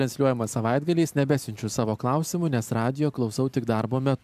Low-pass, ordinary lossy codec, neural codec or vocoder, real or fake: 14.4 kHz; MP3, 96 kbps; none; real